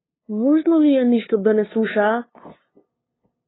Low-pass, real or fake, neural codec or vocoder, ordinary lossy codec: 7.2 kHz; fake; codec, 16 kHz, 2 kbps, FunCodec, trained on LibriTTS, 25 frames a second; AAC, 16 kbps